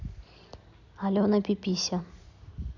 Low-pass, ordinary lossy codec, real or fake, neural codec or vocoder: 7.2 kHz; none; real; none